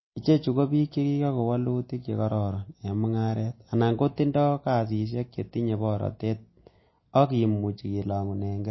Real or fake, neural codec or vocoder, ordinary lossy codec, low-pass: real; none; MP3, 24 kbps; 7.2 kHz